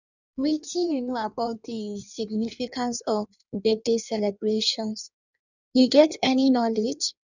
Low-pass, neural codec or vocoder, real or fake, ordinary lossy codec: 7.2 kHz; codec, 16 kHz in and 24 kHz out, 1.1 kbps, FireRedTTS-2 codec; fake; none